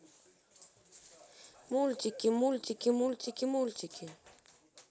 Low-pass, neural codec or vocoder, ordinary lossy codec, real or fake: none; none; none; real